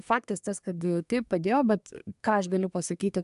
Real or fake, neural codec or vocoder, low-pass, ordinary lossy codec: fake; codec, 24 kHz, 1 kbps, SNAC; 10.8 kHz; AAC, 96 kbps